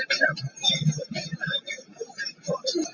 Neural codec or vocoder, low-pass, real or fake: none; 7.2 kHz; real